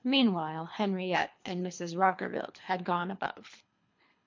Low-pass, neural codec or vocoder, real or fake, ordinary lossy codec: 7.2 kHz; codec, 24 kHz, 3 kbps, HILCodec; fake; MP3, 48 kbps